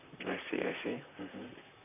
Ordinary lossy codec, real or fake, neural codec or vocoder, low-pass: none; fake; vocoder, 44.1 kHz, 128 mel bands, Pupu-Vocoder; 3.6 kHz